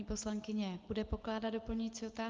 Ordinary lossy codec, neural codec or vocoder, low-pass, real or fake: Opus, 32 kbps; none; 7.2 kHz; real